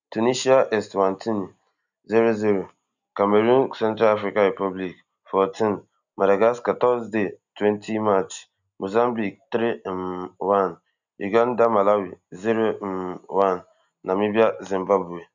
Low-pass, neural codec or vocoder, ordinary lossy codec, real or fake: 7.2 kHz; none; none; real